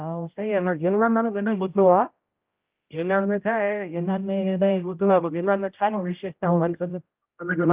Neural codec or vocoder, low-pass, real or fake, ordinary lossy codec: codec, 16 kHz, 0.5 kbps, X-Codec, HuBERT features, trained on general audio; 3.6 kHz; fake; Opus, 24 kbps